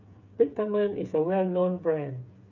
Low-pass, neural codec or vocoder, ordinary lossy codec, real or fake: 7.2 kHz; codec, 16 kHz, 8 kbps, FreqCodec, smaller model; none; fake